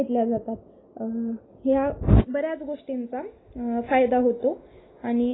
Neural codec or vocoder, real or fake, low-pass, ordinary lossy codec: none; real; 7.2 kHz; AAC, 16 kbps